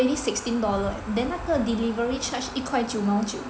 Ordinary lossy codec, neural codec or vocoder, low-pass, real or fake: none; none; none; real